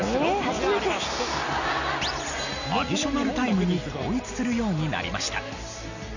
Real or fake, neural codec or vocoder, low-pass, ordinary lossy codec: real; none; 7.2 kHz; none